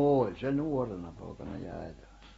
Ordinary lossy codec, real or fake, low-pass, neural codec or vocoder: AAC, 24 kbps; real; 19.8 kHz; none